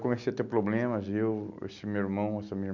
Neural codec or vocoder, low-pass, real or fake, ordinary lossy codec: none; 7.2 kHz; real; none